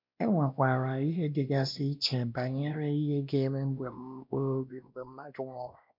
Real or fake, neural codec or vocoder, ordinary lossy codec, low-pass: fake; codec, 16 kHz, 1 kbps, X-Codec, WavLM features, trained on Multilingual LibriSpeech; MP3, 48 kbps; 5.4 kHz